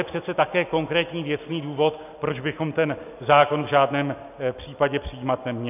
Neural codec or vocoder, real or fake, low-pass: none; real; 3.6 kHz